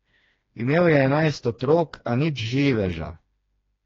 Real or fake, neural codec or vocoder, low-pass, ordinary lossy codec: fake; codec, 16 kHz, 2 kbps, FreqCodec, smaller model; 7.2 kHz; AAC, 32 kbps